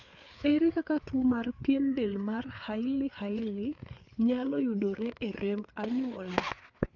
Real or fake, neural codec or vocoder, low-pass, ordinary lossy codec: fake; codec, 16 kHz, 4 kbps, FreqCodec, larger model; 7.2 kHz; none